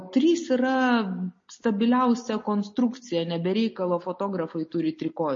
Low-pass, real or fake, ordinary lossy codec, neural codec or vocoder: 7.2 kHz; real; MP3, 32 kbps; none